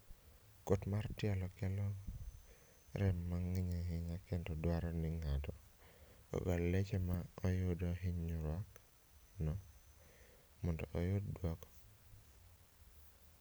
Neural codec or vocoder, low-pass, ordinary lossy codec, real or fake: none; none; none; real